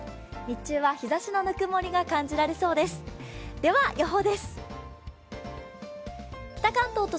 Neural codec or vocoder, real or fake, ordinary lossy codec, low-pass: none; real; none; none